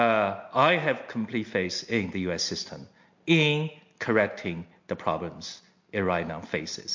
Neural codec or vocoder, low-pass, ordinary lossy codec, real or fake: none; 7.2 kHz; MP3, 48 kbps; real